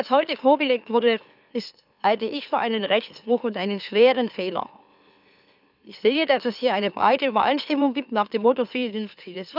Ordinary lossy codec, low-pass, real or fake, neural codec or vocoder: none; 5.4 kHz; fake; autoencoder, 44.1 kHz, a latent of 192 numbers a frame, MeloTTS